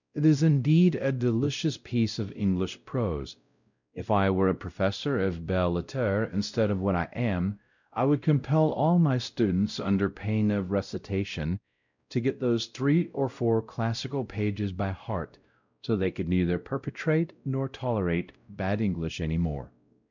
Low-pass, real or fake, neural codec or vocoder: 7.2 kHz; fake; codec, 16 kHz, 0.5 kbps, X-Codec, WavLM features, trained on Multilingual LibriSpeech